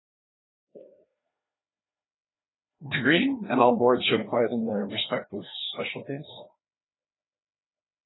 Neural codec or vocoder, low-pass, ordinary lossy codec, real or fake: codec, 16 kHz, 2 kbps, FreqCodec, larger model; 7.2 kHz; AAC, 16 kbps; fake